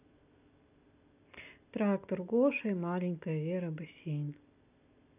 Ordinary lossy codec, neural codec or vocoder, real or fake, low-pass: none; none; real; 3.6 kHz